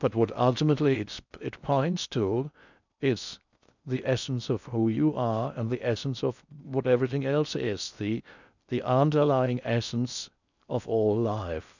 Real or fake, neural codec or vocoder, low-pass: fake; codec, 16 kHz in and 24 kHz out, 0.6 kbps, FocalCodec, streaming, 2048 codes; 7.2 kHz